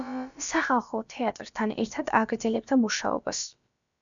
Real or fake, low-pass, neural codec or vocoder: fake; 7.2 kHz; codec, 16 kHz, about 1 kbps, DyCAST, with the encoder's durations